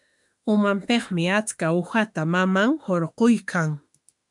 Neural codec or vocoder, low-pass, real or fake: autoencoder, 48 kHz, 32 numbers a frame, DAC-VAE, trained on Japanese speech; 10.8 kHz; fake